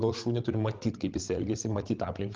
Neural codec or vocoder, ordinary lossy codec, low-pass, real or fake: codec, 16 kHz, 16 kbps, FreqCodec, larger model; Opus, 24 kbps; 7.2 kHz; fake